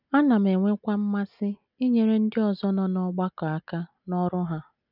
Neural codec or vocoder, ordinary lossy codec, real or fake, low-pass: none; none; real; 5.4 kHz